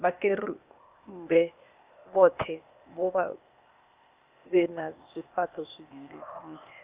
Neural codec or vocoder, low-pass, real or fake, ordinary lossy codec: codec, 16 kHz, 0.8 kbps, ZipCodec; 3.6 kHz; fake; Opus, 64 kbps